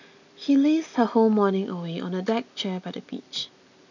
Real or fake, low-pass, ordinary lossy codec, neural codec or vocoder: real; 7.2 kHz; none; none